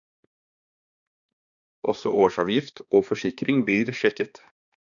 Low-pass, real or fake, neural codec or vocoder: 7.2 kHz; fake; codec, 16 kHz, 2 kbps, X-Codec, HuBERT features, trained on balanced general audio